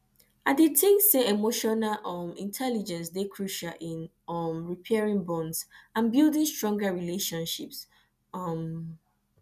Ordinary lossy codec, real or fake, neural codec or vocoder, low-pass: none; real; none; 14.4 kHz